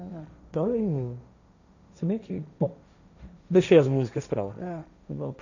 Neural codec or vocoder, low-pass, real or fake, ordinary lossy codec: codec, 16 kHz, 1.1 kbps, Voila-Tokenizer; 7.2 kHz; fake; none